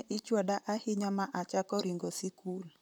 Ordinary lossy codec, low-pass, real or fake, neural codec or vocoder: none; none; fake; vocoder, 44.1 kHz, 128 mel bands, Pupu-Vocoder